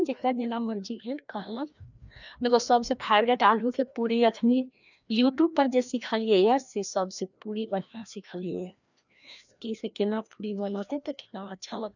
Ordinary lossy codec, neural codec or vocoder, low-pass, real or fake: none; codec, 16 kHz, 1 kbps, FreqCodec, larger model; 7.2 kHz; fake